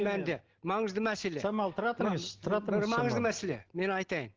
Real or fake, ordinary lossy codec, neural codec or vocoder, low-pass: real; Opus, 16 kbps; none; 7.2 kHz